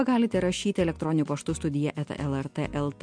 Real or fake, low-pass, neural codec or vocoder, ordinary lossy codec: real; 9.9 kHz; none; MP3, 64 kbps